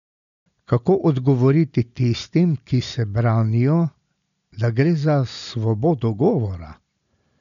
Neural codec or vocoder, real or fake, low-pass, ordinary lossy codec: none; real; 7.2 kHz; none